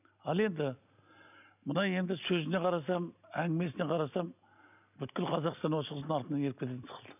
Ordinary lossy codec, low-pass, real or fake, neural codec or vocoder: none; 3.6 kHz; real; none